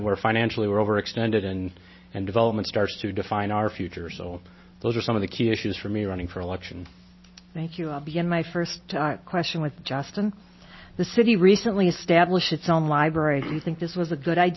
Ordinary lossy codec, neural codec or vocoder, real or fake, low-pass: MP3, 24 kbps; none; real; 7.2 kHz